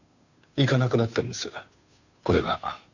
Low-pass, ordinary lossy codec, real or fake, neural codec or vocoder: 7.2 kHz; none; fake; codec, 16 kHz, 2 kbps, FunCodec, trained on Chinese and English, 25 frames a second